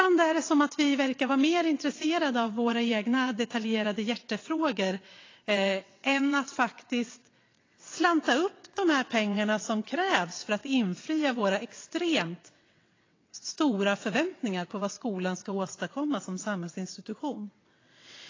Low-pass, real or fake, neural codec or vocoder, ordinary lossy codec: 7.2 kHz; fake; vocoder, 22.05 kHz, 80 mel bands, WaveNeXt; AAC, 32 kbps